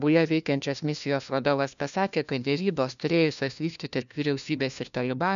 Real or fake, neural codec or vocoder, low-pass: fake; codec, 16 kHz, 1 kbps, FunCodec, trained on LibriTTS, 50 frames a second; 7.2 kHz